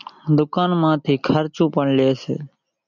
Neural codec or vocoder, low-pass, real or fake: none; 7.2 kHz; real